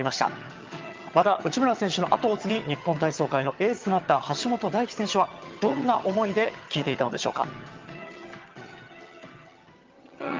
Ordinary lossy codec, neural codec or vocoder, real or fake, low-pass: Opus, 24 kbps; vocoder, 22.05 kHz, 80 mel bands, HiFi-GAN; fake; 7.2 kHz